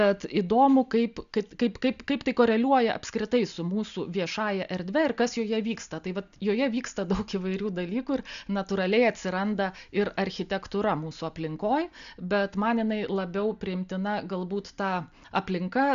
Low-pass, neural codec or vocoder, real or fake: 7.2 kHz; none; real